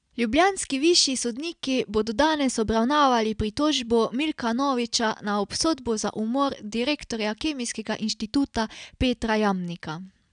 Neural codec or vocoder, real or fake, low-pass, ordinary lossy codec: none; real; 9.9 kHz; none